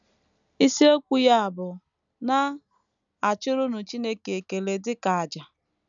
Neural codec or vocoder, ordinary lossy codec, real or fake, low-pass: none; none; real; 7.2 kHz